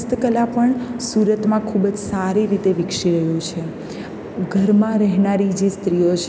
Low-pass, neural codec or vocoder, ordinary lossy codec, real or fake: none; none; none; real